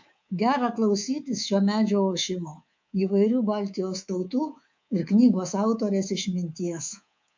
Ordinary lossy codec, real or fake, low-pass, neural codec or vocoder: MP3, 48 kbps; fake; 7.2 kHz; codec, 24 kHz, 3.1 kbps, DualCodec